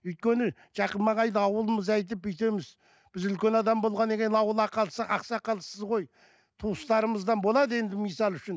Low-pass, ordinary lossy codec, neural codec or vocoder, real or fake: none; none; none; real